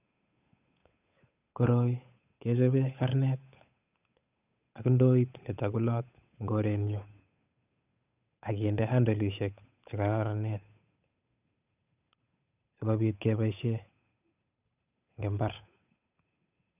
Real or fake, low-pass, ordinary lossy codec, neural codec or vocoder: fake; 3.6 kHz; none; codec, 16 kHz, 8 kbps, FunCodec, trained on Chinese and English, 25 frames a second